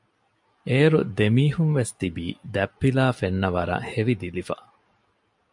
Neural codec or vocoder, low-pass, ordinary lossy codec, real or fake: none; 10.8 kHz; MP3, 96 kbps; real